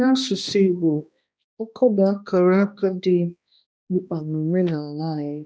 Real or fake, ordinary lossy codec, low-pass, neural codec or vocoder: fake; none; none; codec, 16 kHz, 1 kbps, X-Codec, HuBERT features, trained on balanced general audio